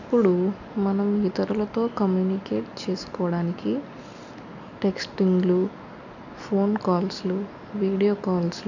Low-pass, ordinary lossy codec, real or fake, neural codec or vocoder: 7.2 kHz; none; real; none